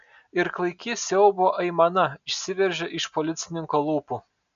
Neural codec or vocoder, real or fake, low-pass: none; real; 7.2 kHz